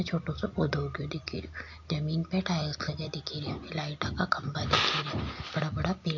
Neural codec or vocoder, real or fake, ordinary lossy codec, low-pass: none; real; AAC, 48 kbps; 7.2 kHz